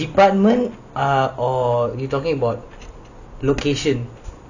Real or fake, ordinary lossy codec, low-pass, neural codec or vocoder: real; none; 7.2 kHz; none